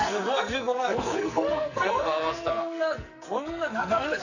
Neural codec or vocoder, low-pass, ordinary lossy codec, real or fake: codec, 44.1 kHz, 2.6 kbps, SNAC; 7.2 kHz; none; fake